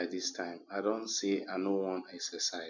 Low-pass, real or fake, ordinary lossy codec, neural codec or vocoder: 7.2 kHz; real; none; none